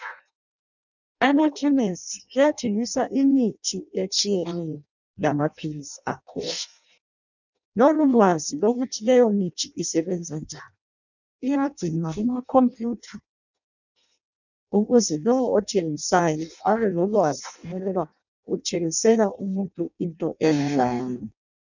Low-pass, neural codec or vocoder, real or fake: 7.2 kHz; codec, 16 kHz in and 24 kHz out, 0.6 kbps, FireRedTTS-2 codec; fake